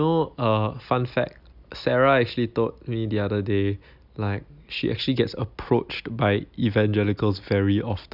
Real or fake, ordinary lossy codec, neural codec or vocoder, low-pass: real; none; none; 5.4 kHz